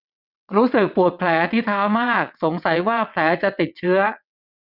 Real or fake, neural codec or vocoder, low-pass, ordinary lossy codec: fake; vocoder, 22.05 kHz, 80 mel bands, WaveNeXt; 5.4 kHz; none